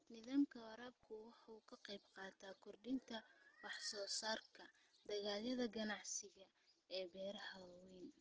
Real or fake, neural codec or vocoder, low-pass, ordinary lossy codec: real; none; 7.2 kHz; Opus, 16 kbps